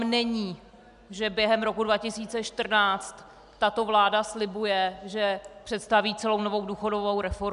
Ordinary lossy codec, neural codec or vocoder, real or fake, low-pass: MP3, 96 kbps; none; real; 10.8 kHz